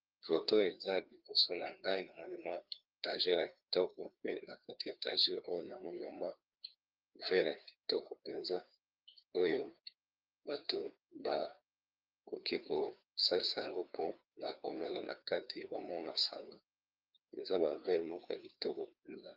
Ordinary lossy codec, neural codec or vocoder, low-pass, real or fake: Opus, 32 kbps; codec, 16 kHz, 2 kbps, FreqCodec, larger model; 5.4 kHz; fake